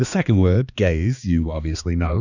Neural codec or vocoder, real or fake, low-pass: codec, 16 kHz, 2 kbps, X-Codec, HuBERT features, trained on balanced general audio; fake; 7.2 kHz